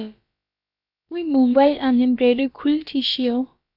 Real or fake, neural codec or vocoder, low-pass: fake; codec, 16 kHz, about 1 kbps, DyCAST, with the encoder's durations; 5.4 kHz